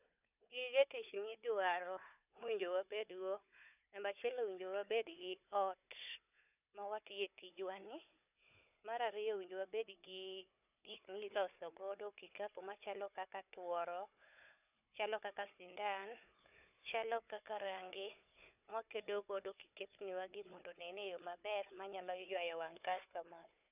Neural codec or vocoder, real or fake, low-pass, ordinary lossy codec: codec, 16 kHz, 4 kbps, FunCodec, trained on Chinese and English, 50 frames a second; fake; 3.6 kHz; none